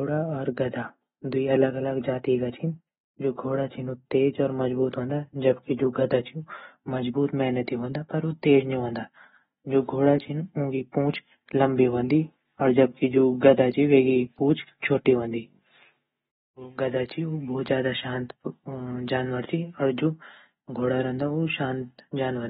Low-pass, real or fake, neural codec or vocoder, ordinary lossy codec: 19.8 kHz; real; none; AAC, 16 kbps